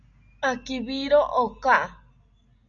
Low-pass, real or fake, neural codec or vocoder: 7.2 kHz; real; none